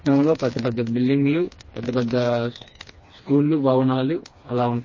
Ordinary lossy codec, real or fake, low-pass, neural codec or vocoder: MP3, 32 kbps; fake; 7.2 kHz; codec, 16 kHz, 2 kbps, FreqCodec, smaller model